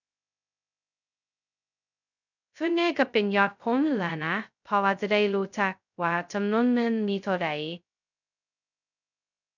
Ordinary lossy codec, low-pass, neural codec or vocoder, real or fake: none; 7.2 kHz; codec, 16 kHz, 0.2 kbps, FocalCodec; fake